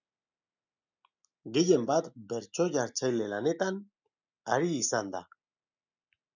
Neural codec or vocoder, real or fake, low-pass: none; real; 7.2 kHz